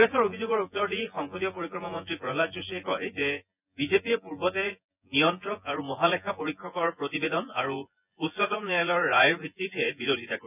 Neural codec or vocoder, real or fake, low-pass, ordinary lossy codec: vocoder, 24 kHz, 100 mel bands, Vocos; fake; 3.6 kHz; none